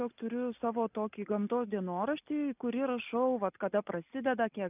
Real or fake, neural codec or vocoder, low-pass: real; none; 3.6 kHz